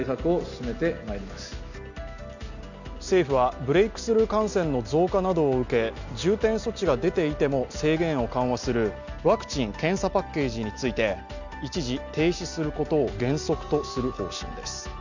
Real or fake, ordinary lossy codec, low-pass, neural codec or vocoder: real; none; 7.2 kHz; none